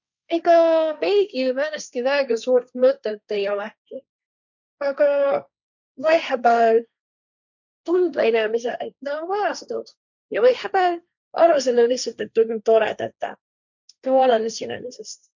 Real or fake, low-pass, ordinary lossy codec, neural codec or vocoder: fake; 7.2 kHz; none; codec, 16 kHz, 1.1 kbps, Voila-Tokenizer